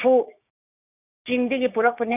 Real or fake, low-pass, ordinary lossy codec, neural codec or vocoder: fake; 3.6 kHz; none; codec, 16 kHz, 6 kbps, DAC